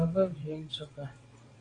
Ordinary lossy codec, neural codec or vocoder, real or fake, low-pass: AAC, 32 kbps; vocoder, 22.05 kHz, 80 mel bands, WaveNeXt; fake; 9.9 kHz